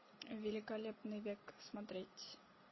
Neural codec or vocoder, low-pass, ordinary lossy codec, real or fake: none; 7.2 kHz; MP3, 24 kbps; real